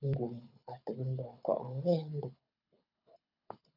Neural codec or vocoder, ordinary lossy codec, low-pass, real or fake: codec, 24 kHz, 6 kbps, HILCodec; MP3, 48 kbps; 5.4 kHz; fake